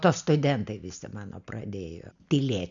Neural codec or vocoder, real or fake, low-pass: none; real; 7.2 kHz